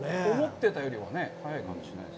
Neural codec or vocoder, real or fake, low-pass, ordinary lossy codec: none; real; none; none